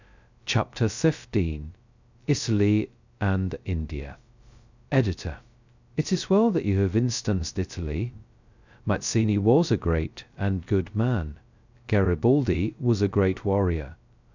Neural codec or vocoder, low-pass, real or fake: codec, 16 kHz, 0.2 kbps, FocalCodec; 7.2 kHz; fake